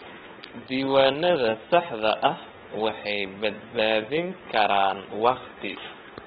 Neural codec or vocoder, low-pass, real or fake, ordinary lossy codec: codec, 16 kHz, 16 kbps, FunCodec, trained on LibriTTS, 50 frames a second; 7.2 kHz; fake; AAC, 16 kbps